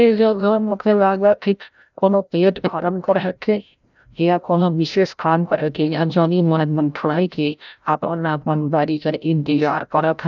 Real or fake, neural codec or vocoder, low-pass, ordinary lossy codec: fake; codec, 16 kHz, 0.5 kbps, FreqCodec, larger model; 7.2 kHz; none